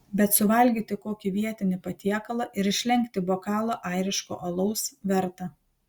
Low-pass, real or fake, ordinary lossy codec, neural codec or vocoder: 19.8 kHz; real; Opus, 64 kbps; none